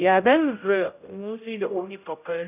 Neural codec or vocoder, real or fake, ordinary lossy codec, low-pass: codec, 16 kHz, 0.5 kbps, X-Codec, HuBERT features, trained on general audio; fake; none; 3.6 kHz